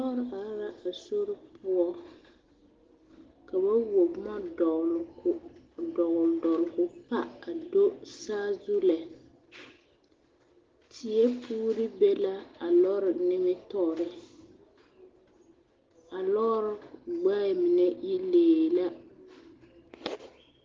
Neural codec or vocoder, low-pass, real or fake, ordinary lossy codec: none; 7.2 kHz; real; Opus, 16 kbps